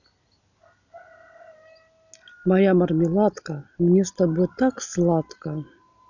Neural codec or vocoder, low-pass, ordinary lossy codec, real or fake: none; 7.2 kHz; none; real